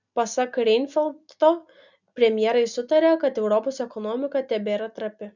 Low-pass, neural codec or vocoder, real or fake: 7.2 kHz; none; real